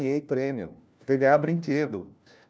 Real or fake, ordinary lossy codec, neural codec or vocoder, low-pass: fake; none; codec, 16 kHz, 1 kbps, FunCodec, trained on LibriTTS, 50 frames a second; none